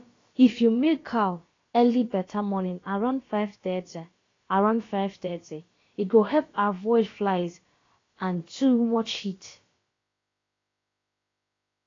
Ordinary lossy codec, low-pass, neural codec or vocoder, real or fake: AAC, 32 kbps; 7.2 kHz; codec, 16 kHz, about 1 kbps, DyCAST, with the encoder's durations; fake